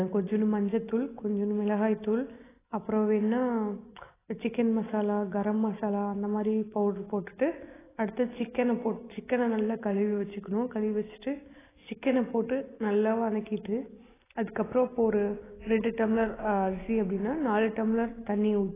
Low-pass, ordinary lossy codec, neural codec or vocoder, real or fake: 3.6 kHz; AAC, 16 kbps; none; real